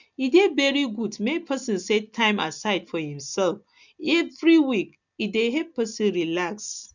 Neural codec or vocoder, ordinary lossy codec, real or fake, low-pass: none; none; real; 7.2 kHz